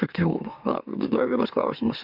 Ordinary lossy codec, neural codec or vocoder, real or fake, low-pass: MP3, 48 kbps; autoencoder, 44.1 kHz, a latent of 192 numbers a frame, MeloTTS; fake; 5.4 kHz